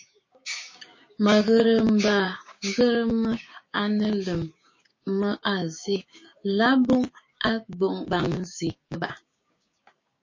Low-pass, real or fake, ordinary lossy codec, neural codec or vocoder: 7.2 kHz; fake; MP3, 32 kbps; codec, 16 kHz, 6 kbps, DAC